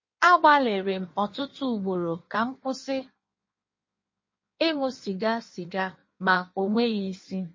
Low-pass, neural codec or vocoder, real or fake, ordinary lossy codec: 7.2 kHz; codec, 16 kHz in and 24 kHz out, 1.1 kbps, FireRedTTS-2 codec; fake; MP3, 32 kbps